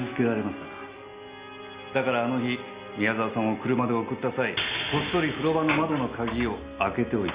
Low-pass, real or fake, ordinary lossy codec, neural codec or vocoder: 3.6 kHz; real; Opus, 64 kbps; none